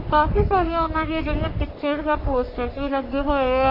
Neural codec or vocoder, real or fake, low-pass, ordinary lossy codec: codec, 44.1 kHz, 3.4 kbps, Pupu-Codec; fake; 5.4 kHz; MP3, 32 kbps